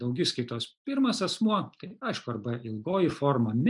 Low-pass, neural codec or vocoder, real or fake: 10.8 kHz; none; real